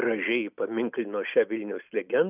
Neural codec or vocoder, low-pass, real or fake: none; 3.6 kHz; real